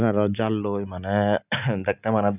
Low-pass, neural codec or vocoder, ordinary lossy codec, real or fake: 3.6 kHz; none; none; real